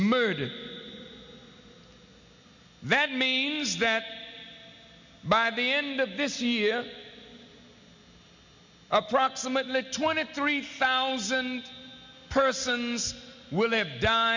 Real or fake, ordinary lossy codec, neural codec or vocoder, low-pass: real; MP3, 64 kbps; none; 7.2 kHz